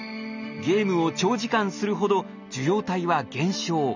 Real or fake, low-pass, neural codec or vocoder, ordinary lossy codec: real; 7.2 kHz; none; none